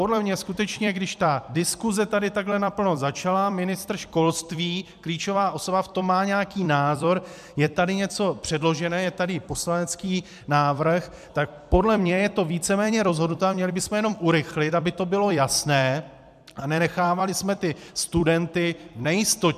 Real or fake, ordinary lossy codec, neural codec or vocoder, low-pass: fake; MP3, 96 kbps; vocoder, 44.1 kHz, 128 mel bands every 256 samples, BigVGAN v2; 14.4 kHz